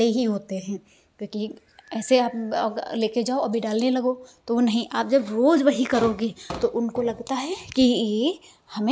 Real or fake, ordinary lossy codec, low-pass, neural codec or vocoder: real; none; none; none